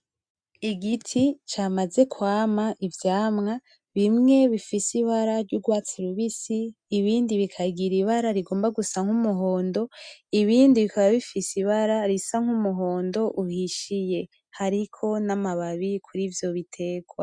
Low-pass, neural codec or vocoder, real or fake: 9.9 kHz; none; real